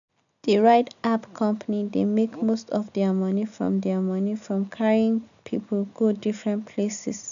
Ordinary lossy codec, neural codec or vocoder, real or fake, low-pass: none; none; real; 7.2 kHz